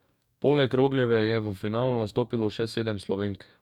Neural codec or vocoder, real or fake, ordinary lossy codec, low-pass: codec, 44.1 kHz, 2.6 kbps, DAC; fake; none; 19.8 kHz